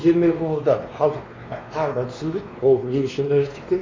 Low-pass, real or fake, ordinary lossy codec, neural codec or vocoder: 7.2 kHz; fake; AAC, 32 kbps; codec, 16 kHz, 2 kbps, X-Codec, WavLM features, trained on Multilingual LibriSpeech